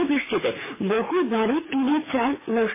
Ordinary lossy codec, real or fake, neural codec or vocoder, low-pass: MP3, 16 kbps; fake; codec, 24 kHz, 6 kbps, HILCodec; 3.6 kHz